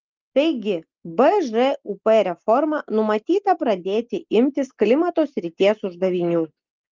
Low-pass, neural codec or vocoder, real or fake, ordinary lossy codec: 7.2 kHz; none; real; Opus, 24 kbps